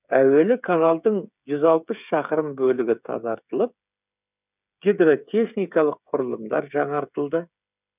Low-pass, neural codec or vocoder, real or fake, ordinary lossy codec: 3.6 kHz; codec, 16 kHz, 8 kbps, FreqCodec, smaller model; fake; none